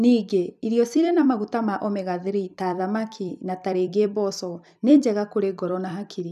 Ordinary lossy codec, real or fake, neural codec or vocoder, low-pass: none; fake; vocoder, 44.1 kHz, 128 mel bands every 256 samples, BigVGAN v2; 14.4 kHz